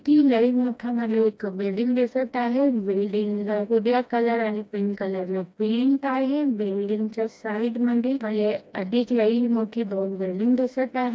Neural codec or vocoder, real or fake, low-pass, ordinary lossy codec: codec, 16 kHz, 1 kbps, FreqCodec, smaller model; fake; none; none